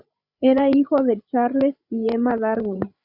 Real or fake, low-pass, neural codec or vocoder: fake; 5.4 kHz; codec, 16 kHz, 8 kbps, FreqCodec, larger model